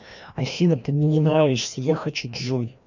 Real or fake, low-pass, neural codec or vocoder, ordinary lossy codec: fake; 7.2 kHz; codec, 16 kHz, 1 kbps, FreqCodec, larger model; none